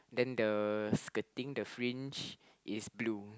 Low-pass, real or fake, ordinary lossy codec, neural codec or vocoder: none; real; none; none